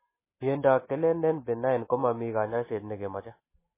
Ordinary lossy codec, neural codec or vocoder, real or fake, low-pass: MP3, 16 kbps; none; real; 3.6 kHz